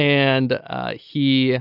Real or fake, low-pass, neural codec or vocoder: real; 5.4 kHz; none